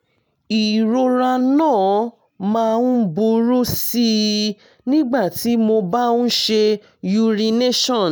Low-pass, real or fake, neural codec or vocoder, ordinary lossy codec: none; real; none; none